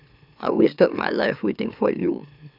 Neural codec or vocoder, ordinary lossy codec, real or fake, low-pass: autoencoder, 44.1 kHz, a latent of 192 numbers a frame, MeloTTS; none; fake; 5.4 kHz